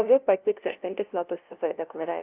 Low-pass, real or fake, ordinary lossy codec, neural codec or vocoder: 3.6 kHz; fake; Opus, 24 kbps; codec, 16 kHz, 0.5 kbps, FunCodec, trained on LibriTTS, 25 frames a second